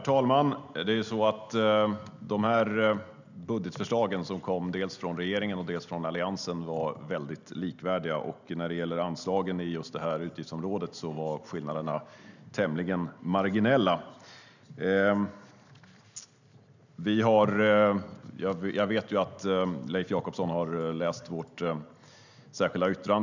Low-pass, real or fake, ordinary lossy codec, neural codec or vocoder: 7.2 kHz; real; none; none